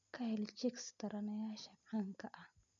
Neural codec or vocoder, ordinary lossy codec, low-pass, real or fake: none; none; 7.2 kHz; real